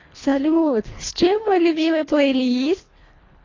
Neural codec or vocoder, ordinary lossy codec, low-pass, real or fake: codec, 24 kHz, 1.5 kbps, HILCodec; AAC, 32 kbps; 7.2 kHz; fake